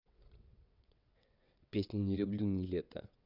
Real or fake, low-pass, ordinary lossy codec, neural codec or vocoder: fake; 5.4 kHz; none; vocoder, 22.05 kHz, 80 mel bands, WaveNeXt